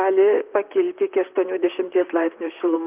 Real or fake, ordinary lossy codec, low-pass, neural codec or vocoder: fake; Opus, 24 kbps; 3.6 kHz; vocoder, 44.1 kHz, 128 mel bands, Pupu-Vocoder